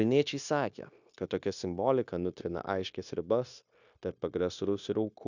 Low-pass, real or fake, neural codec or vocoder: 7.2 kHz; fake; codec, 16 kHz, 0.9 kbps, LongCat-Audio-Codec